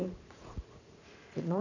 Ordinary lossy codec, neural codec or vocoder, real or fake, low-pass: none; vocoder, 44.1 kHz, 80 mel bands, Vocos; fake; 7.2 kHz